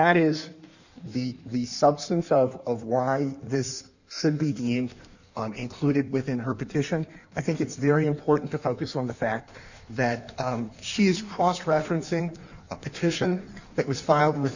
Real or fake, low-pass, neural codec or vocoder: fake; 7.2 kHz; codec, 16 kHz in and 24 kHz out, 1.1 kbps, FireRedTTS-2 codec